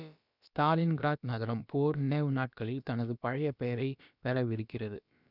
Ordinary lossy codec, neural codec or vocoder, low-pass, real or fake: none; codec, 16 kHz, about 1 kbps, DyCAST, with the encoder's durations; 5.4 kHz; fake